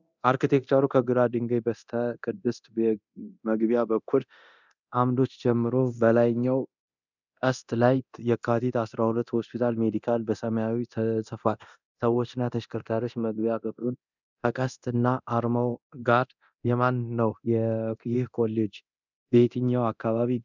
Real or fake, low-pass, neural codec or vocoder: fake; 7.2 kHz; codec, 24 kHz, 0.9 kbps, DualCodec